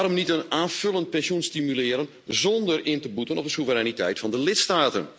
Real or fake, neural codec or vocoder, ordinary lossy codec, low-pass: real; none; none; none